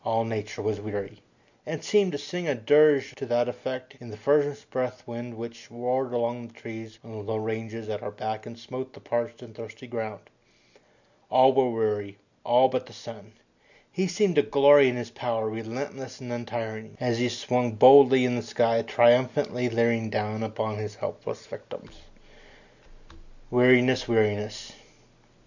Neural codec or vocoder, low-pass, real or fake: none; 7.2 kHz; real